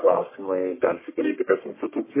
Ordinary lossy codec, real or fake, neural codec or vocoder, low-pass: MP3, 16 kbps; fake; codec, 44.1 kHz, 1.7 kbps, Pupu-Codec; 3.6 kHz